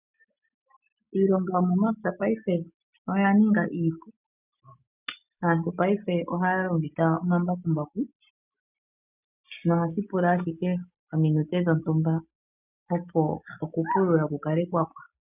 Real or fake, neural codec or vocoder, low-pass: real; none; 3.6 kHz